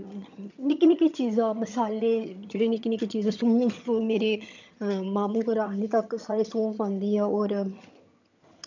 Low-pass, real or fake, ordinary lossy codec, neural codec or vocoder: 7.2 kHz; fake; none; vocoder, 22.05 kHz, 80 mel bands, HiFi-GAN